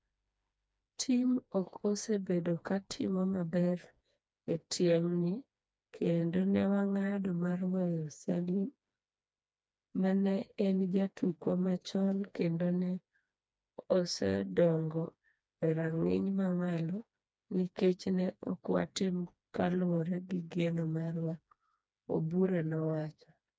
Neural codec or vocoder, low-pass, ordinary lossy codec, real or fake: codec, 16 kHz, 2 kbps, FreqCodec, smaller model; none; none; fake